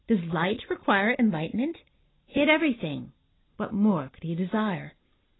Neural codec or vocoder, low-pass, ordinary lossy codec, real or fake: none; 7.2 kHz; AAC, 16 kbps; real